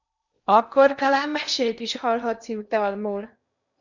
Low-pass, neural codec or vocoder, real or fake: 7.2 kHz; codec, 16 kHz in and 24 kHz out, 0.8 kbps, FocalCodec, streaming, 65536 codes; fake